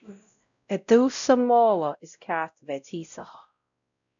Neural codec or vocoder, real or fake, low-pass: codec, 16 kHz, 0.5 kbps, X-Codec, WavLM features, trained on Multilingual LibriSpeech; fake; 7.2 kHz